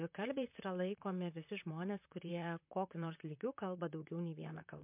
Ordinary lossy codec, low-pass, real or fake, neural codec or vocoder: MP3, 32 kbps; 3.6 kHz; fake; vocoder, 44.1 kHz, 128 mel bands, Pupu-Vocoder